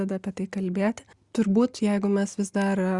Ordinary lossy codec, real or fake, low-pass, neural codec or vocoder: Opus, 64 kbps; real; 10.8 kHz; none